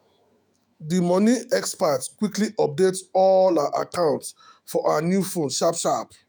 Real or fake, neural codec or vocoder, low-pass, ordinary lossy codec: fake; autoencoder, 48 kHz, 128 numbers a frame, DAC-VAE, trained on Japanese speech; none; none